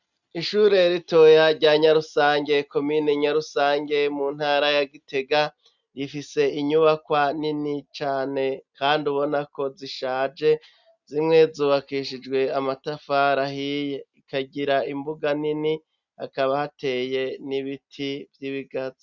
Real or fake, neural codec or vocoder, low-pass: real; none; 7.2 kHz